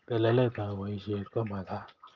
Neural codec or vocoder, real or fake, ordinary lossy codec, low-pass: none; real; Opus, 24 kbps; 7.2 kHz